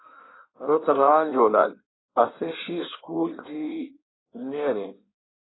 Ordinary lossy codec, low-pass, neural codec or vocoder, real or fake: AAC, 16 kbps; 7.2 kHz; codec, 16 kHz in and 24 kHz out, 1.1 kbps, FireRedTTS-2 codec; fake